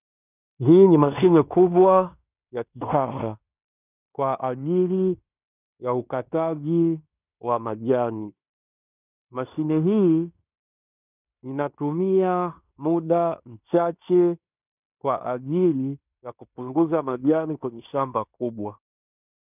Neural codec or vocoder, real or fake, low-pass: codec, 16 kHz in and 24 kHz out, 0.9 kbps, LongCat-Audio-Codec, fine tuned four codebook decoder; fake; 3.6 kHz